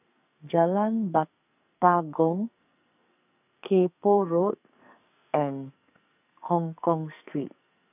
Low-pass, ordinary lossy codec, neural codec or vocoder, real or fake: 3.6 kHz; none; codec, 44.1 kHz, 2.6 kbps, SNAC; fake